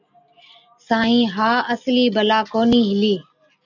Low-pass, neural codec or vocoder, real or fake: 7.2 kHz; none; real